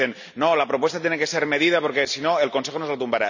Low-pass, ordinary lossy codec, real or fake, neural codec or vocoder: 7.2 kHz; none; real; none